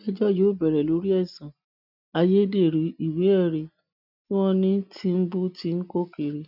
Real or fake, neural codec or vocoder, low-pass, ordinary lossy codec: real; none; 5.4 kHz; none